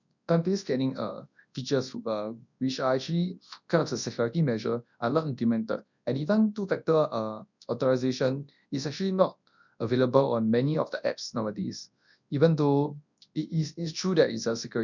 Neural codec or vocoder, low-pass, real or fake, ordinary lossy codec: codec, 24 kHz, 0.9 kbps, WavTokenizer, large speech release; 7.2 kHz; fake; none